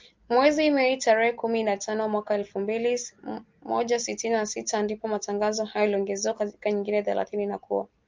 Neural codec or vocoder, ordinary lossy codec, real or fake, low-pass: none; Opus, 24 kbps; real; 7.2 kHz